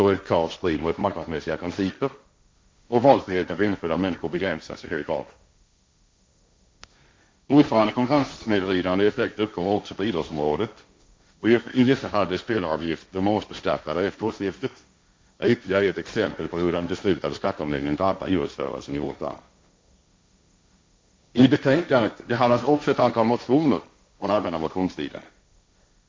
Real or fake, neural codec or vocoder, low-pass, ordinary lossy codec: fake; codec, 16 kHz, 1.1 kbps, Voila-Tokenizer; none; none